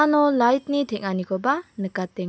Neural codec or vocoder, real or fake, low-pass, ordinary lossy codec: none; real; none; none